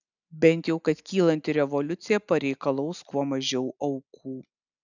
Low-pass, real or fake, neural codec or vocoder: 7.2 kHz; real; none